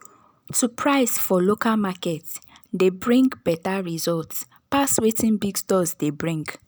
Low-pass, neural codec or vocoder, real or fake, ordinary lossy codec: none; none; real; none